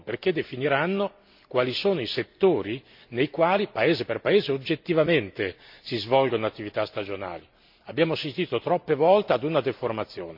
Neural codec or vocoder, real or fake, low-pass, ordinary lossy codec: none; real; 5.4 kHz; MP3, 48 kbps